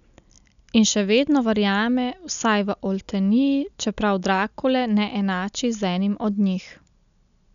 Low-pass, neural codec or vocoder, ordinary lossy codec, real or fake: 7.2 kHz; none; none; real